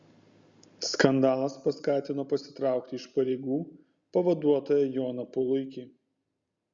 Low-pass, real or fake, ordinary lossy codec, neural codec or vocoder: 7.2 kHz; real; Opus, 64 kbps; none